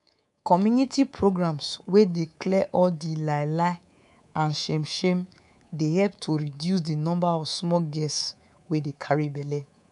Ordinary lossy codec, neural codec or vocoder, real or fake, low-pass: none; codec, 24 kHz, 3.1 kbps, DualCodec; fake; 10.8 kHz